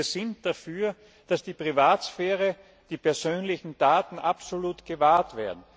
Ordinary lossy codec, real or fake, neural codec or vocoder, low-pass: none; real; none; none